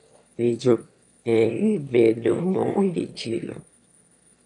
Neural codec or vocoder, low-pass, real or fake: autoencoder, 22.05 kHz, a latent of 192 numbers a frame, VITS, trained on one speaker; 9.9 kHz; fake